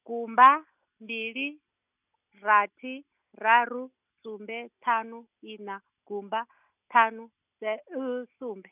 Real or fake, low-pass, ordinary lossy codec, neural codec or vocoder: real; 3.6 kHz; none; none